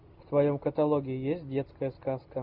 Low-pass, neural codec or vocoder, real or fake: 5.4 kHz; none; real